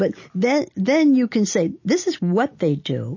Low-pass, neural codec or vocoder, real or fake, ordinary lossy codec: 7.2 kHz; none; real; MP3, 32 kbps